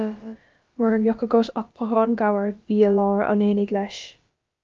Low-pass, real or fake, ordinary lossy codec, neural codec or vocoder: 7.2 kHz; fake; Opus, 32 kbps; codec, 16 kHz, about 1 kbps, DyCAST, with the encoder's durations